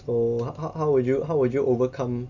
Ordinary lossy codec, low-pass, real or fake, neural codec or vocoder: none; 7.2 kHz; real; none